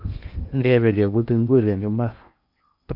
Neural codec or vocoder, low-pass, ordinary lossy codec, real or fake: codec, 16 kHz in and 24 kHz out, 0.8 kbps, FocalCodec, streaming, 65536 codes; 5.4 kHz; none; fake